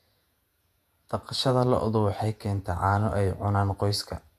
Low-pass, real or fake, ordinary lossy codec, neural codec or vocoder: 14.4 kHz; fake; none; vocoder, 48 kHz, 128 mel bands, Vocos